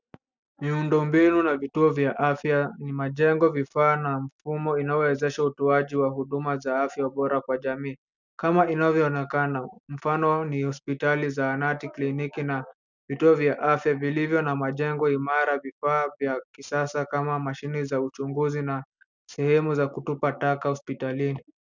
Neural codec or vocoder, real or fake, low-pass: none; real; 7.2 kHz